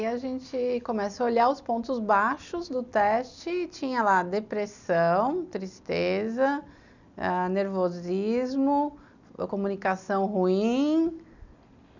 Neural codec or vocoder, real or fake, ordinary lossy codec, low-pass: none; real; none; 7.2 kHz